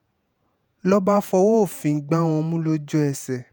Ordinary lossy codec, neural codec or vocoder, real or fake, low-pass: none; vocoder, 48 kHz, 128 mel bands, Vocos; fake; none